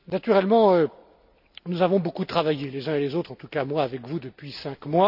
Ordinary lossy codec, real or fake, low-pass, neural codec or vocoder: none; real; 5.4 kHz; none